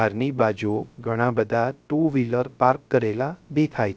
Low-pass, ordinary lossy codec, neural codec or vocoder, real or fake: none; none; codec, 16 kHz, 0.3 kbps, FocalCodec; fake